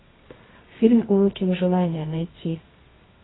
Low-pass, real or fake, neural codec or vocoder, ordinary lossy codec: 7.2 kHz; fake; codec, 24 kHz, 0.9 kbps, WavTokenizer, medium music audio release; AAC, 16 kbps